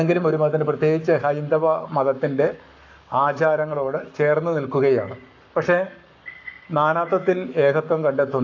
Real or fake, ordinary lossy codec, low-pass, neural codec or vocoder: fake; AAC, 48 kbps; 7.2 kHz; codec, 44.1 kHz, 7.8 kbps, Pupu-Codec